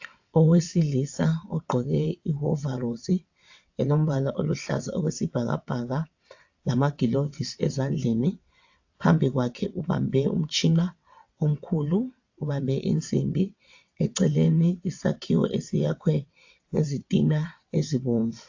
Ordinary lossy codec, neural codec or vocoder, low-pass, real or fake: AAC, 48 kbps; vocoder, 22.05 kHz, 80 mel bands, WaveNeXt; 7.2 kHz; fake